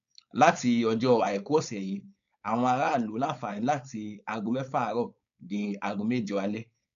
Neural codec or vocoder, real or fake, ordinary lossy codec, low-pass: codec, 16 kHz, 4.8 kbps, FACodec; fake; none; 7.2 kHz